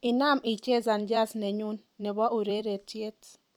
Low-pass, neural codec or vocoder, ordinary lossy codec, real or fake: 19.8 kHz; vocoder, 44.1 kHz, 128 mel bands every 256 samples, BigVGAN v2; none; fake